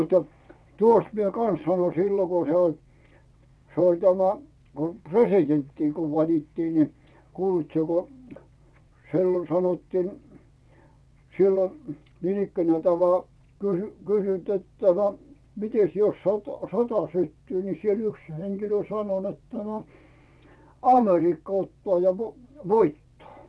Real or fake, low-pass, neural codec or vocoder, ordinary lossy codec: fake; none; vocoder, 22.05 kHz, 80 mel bands, WaveNeXt; none